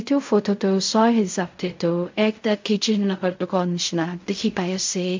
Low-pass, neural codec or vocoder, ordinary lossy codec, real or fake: 7.2 kHz; codec, 16 kHz in and 24 kHz out, 0.4 kbps, LongCat-Audio-Codec, fine tuned four codebook decoder; MP3, 64 kbps; fake